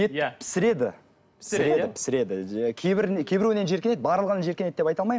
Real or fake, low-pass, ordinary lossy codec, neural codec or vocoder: real; none; none; none